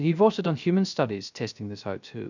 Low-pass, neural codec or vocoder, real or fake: 7.2 kHz; codec, 16 kHz, 0.3 kbps, FocalCodec; fake